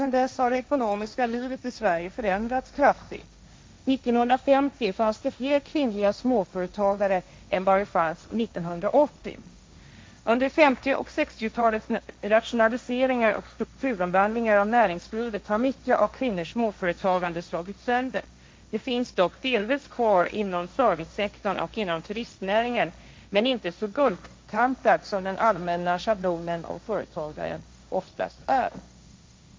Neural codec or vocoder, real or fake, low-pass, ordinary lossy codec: codec, 16 kHz, 1.1 kbps, Voila-Tokenizer; fake; none; none